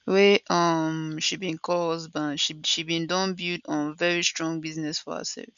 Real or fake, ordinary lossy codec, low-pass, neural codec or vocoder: real; none; 7.2 kHz; none